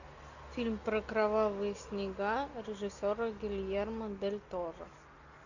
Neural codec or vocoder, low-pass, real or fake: none; 7.2 kHz; real